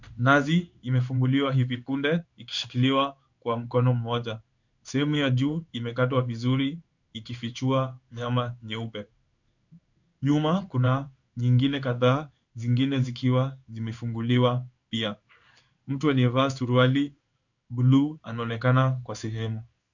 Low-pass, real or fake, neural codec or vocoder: 7.2 kHz; fake; codec, 16 kHz in and 24 kHz out, 1 kbps, XY-Tokenizer